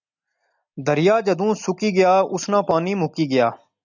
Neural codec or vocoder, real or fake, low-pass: none; real; 7.2 kHz